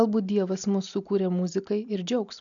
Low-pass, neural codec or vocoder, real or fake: 7.2 kHz; none; real